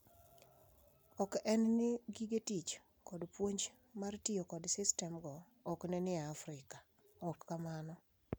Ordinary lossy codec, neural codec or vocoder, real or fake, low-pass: none; none; real; none